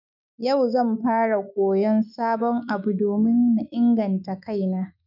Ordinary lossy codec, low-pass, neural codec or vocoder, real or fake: none; 5.4 kHz; autoencoder, 48 kHz, 128 numbers a frame, DAC-VAE, trained on Japanese speech; fake